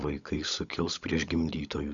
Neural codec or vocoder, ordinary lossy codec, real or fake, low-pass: codec, 16 kHz, 16 kbps, FunCodec, trained on LibriTTS, 50 frames a second; Opus, 64 kbps; fake; 7.2 kHz